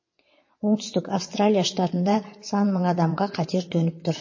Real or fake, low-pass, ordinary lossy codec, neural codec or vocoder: fake; 7.2 kHz; MP3, 32 kbps; vocoder, 22.05 kHz, 80 mel bands, WaveNeXt